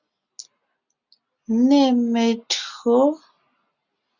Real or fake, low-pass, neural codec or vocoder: real; 7.2 kHz; none